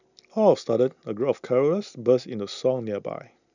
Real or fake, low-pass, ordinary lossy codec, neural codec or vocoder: real; 7.2 kHz; none; none